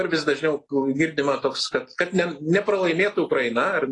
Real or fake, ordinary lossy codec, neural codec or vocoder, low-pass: real; AAC, 32 kbps; none; 10.8 kHz